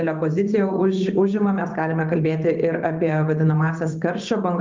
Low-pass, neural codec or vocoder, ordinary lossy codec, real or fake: 7.2 kHz; vocoder, 24 kHz, 100 mel bands, Vocos; Opus, 32 kbps; fake